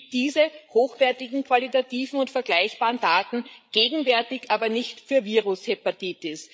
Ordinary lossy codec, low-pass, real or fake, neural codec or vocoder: none; none; fake; codec, 16 kHz, 8 kbps, FreqCodec, larger model